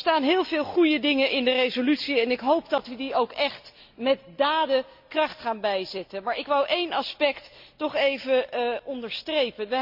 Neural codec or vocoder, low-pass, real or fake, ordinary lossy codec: none; 5.4 kHz; real; MP3, 48 kbps